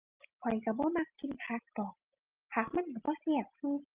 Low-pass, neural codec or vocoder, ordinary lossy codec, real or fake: 3.6 kHz; none; Opus, 32 kbps; real